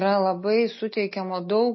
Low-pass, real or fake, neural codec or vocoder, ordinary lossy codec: 7.2 kHz; real; none; MP3, 24 kbps